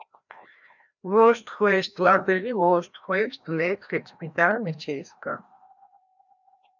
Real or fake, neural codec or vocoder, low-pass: fake; codec, 16 kHz, 1 kbps, FreqCodec, larger model; 7.2 kHz